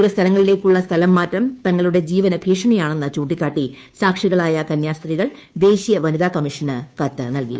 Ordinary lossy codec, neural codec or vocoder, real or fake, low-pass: none; codec, 16 kHz, 2 kbps, FunCodec, trained on Chinese and English, 25 frames a second; fake; none